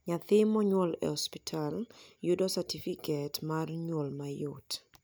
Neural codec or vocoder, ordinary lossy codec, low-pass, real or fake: none; none; none; real